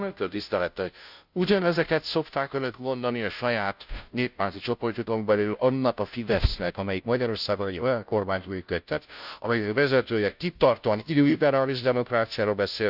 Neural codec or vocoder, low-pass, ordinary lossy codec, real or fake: codec, 16 kHz, 0.5 kbps, FunCodec, trained on Chinese and English, 25 frames a second; 5.4 kHz; none; fake